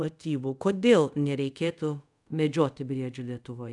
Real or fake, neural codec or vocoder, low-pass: fake; codec, 24 kHz, 0.5 kbps, DualCodec; 10.8 kHz